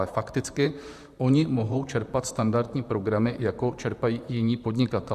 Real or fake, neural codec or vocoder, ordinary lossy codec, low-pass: fake; vocoder, 44.1 kHz, 128 mel bands, Pupu-Vocoder; Opus, 64 kbps; 14.4 kHz